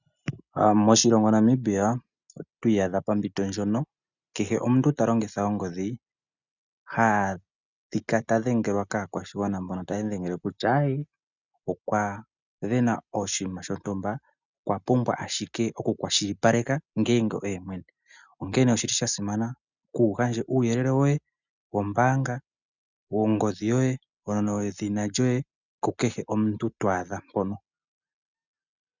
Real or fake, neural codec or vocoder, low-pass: real; none; 7.2 kHz